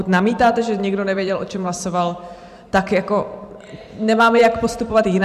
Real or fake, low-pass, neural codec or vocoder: fake; 14.4 kHz; vocoder, 44.1 kHz, 128 mel bands every 512 samples, BigVGAN v2